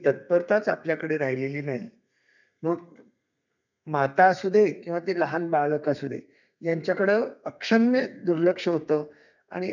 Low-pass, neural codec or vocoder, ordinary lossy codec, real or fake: 7.2 kHz; codec, 32 kHz, 1.9 kbps, SNAC; none; fake